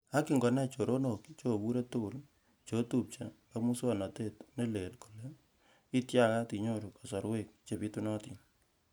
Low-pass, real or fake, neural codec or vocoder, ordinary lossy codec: none; real; none; none